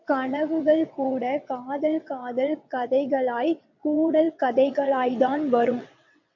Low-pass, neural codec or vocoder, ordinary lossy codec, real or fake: 7.2 kHz; vocoder, 22.05 kHz, 80 mel bands, WaveNeXt; AAC, 48 kbps; fake